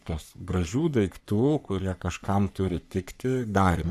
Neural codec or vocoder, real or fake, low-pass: codec, 44.1 kHz, 3.4 kbps, Pupu-Codec; fake; 14.4 kHz